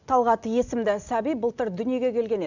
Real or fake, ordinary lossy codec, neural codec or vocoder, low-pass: real; none; none; 7.2 kHz